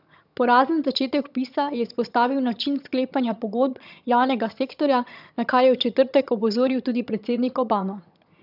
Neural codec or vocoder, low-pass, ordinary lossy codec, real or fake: vocoder, 22.05 kHz, 80 mel bands, HiFi-GAN; 5.4 kHz; none; fake